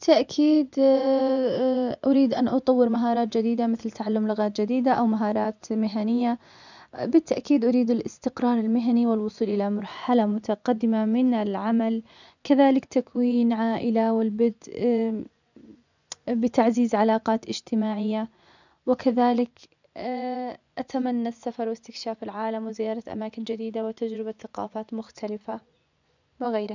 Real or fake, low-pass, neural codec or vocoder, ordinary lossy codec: fake; 7.2 kHz; vocoder, 24 kHz, 100 mel bands, Vocos; none